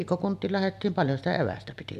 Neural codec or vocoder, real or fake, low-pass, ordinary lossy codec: none; real; 14.4 kHz; none